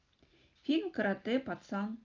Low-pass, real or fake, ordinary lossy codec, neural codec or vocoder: 7.2 kHz; real; Opus, 24 kbps; none